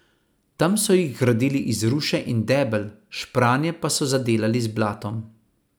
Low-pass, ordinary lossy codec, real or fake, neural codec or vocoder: none; none; real; none